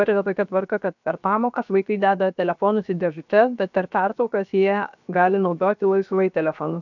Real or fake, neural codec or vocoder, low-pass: fake; codec, 16 kHz, 0.7 kbps, FocalCodec; 7.2 kHz